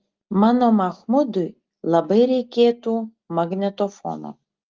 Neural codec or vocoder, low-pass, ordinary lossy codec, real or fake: none; 7.2 kHz; Opus, 32 kbps; real